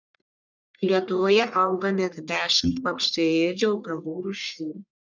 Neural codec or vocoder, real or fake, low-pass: codec, 44.1 kHz, 1.7 kbps, Pupu-Codec; fake; 7.2 kHz